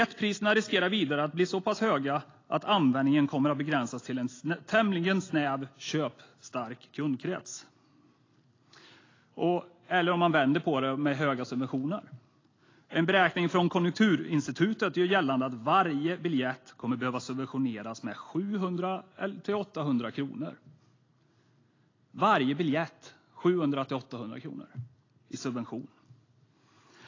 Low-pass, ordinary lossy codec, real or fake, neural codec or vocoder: 7.2 kHz; AAC, 32 kbps; real; none